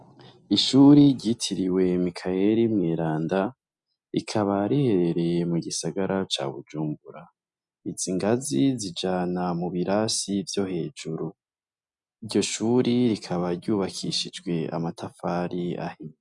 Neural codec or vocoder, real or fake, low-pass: none; real; 10.8 kHz